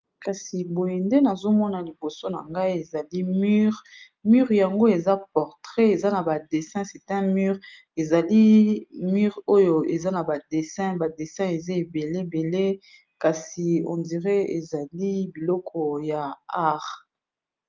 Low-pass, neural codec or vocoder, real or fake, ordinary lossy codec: 7.2 kHz; none; real; Opus, 24 kbps